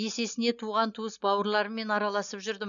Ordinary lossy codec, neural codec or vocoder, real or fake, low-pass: none; none; real; 7.2 kHz